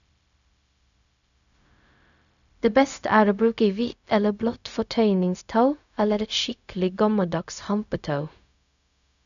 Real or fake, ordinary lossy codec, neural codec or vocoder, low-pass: fake; none; codec, 16 kHz, 0.4 kbps, LongCat-Audio-Codec; 7.2 kHz